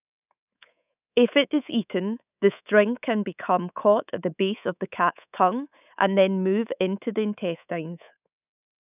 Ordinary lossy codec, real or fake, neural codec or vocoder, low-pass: none; fake; codec, 24 kHz, 3.1 kbps, DualCodec; 3.6 kHz